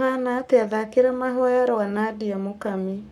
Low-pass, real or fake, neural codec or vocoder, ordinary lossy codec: 19.8 kHz; fake; codec, 44.1 kHz, 7.8 kbps, Pupu-Codec; none